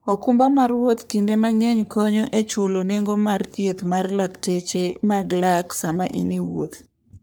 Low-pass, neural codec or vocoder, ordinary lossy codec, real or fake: none; codec, 44.1 kHz, 3.4 kbps, Pupu-Codec; none; fake